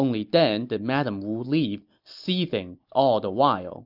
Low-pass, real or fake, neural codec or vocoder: 5.4 kHz; real; none